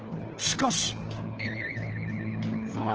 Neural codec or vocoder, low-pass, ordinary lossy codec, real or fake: codec, 16 kHz, 4 kbps, FunCodec, trained on LibriTTS, 50 frames a second; 7.2 kHz; Opus, 16 kbps; fake